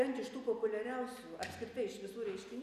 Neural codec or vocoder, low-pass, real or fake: none; 14.4 kHz; real